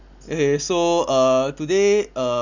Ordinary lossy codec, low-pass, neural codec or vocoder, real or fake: none; 7.2 kHz; none; real